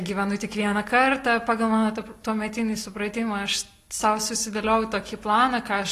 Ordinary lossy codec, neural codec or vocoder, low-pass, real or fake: AAC, 48 kbps; none; 14.4 kHz; real